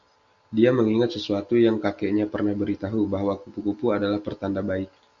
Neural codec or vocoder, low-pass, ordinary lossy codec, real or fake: none; 7.2 kHz; Opus, 64 kbps; real